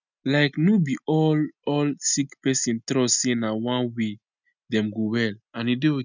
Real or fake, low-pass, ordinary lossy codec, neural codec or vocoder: real; 7.2 kHz; none; none